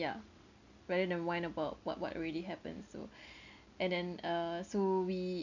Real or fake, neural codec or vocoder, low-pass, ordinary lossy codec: real; none; 7.2 kHz; none